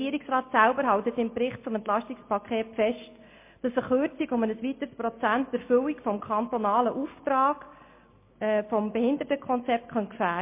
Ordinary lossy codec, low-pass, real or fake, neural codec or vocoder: MP3, 24 kbps; 3.6 kHz; real; none